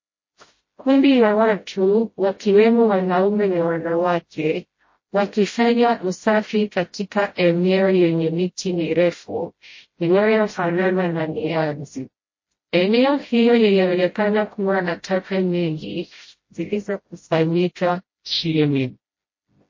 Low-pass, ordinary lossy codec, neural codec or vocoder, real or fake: 7.2 kHz; MP3, 32 kbps; codec, 16 kHz, 0.5 kbps, FreqCodec, smaller model; fake